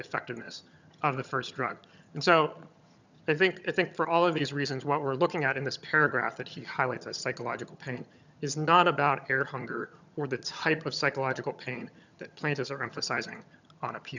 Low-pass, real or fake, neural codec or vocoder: 7.2 kHz; fake; vocoder, 22.05 kHz, 80 mel bands, HiFi-GAN